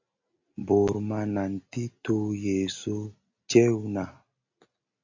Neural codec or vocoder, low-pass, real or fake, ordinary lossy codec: none; 7.2 kHz; real; AAC, 48 kbps